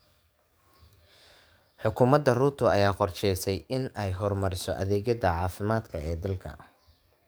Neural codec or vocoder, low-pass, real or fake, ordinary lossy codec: codec, 44.1 kHz, 7.8 kbps, DAC; none; fake; none